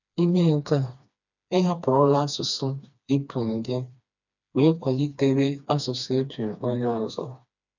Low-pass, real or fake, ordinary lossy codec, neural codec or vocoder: 7.2 kHz; fake; none; codec, 16 kHz, 2 kbps, FreqCodec, smaller model